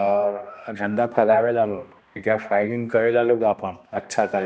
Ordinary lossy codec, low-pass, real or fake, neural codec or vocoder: none; none; fake; codec, 16 kHz, 1 kbps, X-Codec, HuBERT features, trained on balanced general audio